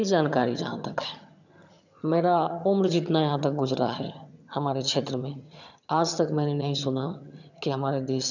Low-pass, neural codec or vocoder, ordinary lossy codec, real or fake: 7.2 kHz; vocoder, 22.05 kHz, 80 mel bands, HiFi-GAN; none; fake